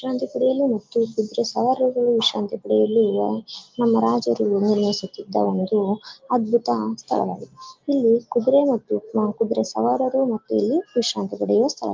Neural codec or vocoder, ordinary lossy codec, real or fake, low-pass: none; Opus, 32 kbps; real; 7.2 kHz